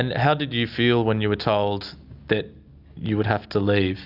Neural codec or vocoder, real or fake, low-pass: none; real; 5.4 kHz